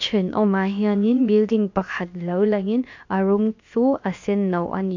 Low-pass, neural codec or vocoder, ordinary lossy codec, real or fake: 7.2 kHz; codec, 16 kHz, about 1 kbps, DyCAST, with the encoder's durations; MP3, 48 kbps; fake